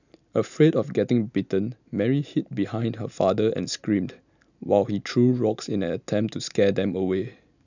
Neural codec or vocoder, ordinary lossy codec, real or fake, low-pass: none; none; real; 7.2 kHz